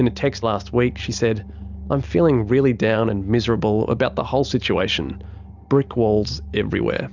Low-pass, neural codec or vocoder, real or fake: 7.2 kHz; none; real